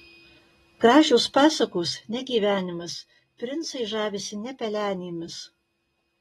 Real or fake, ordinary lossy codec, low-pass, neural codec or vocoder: real; AAC, 32 kbps; 19.8 kHz; none